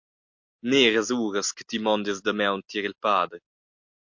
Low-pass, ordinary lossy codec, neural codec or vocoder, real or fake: 7.2 kHz; MP3, 48 kbps; none; real